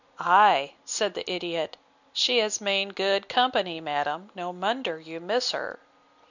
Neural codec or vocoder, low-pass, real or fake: none; 7.2 kHz; real